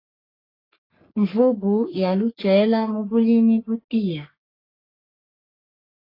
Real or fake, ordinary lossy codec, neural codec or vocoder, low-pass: fake; AAC, 24 kbps; codec, 44.1 kHz, 3.4 kbps, Pupu-Codec; 5.4 kHz